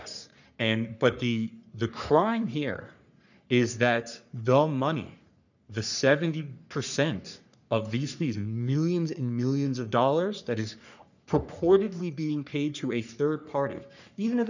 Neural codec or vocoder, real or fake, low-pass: codec, 44.1 kHz, 3.4 kbps, Pupu-Codec; fake; 7.2 kHz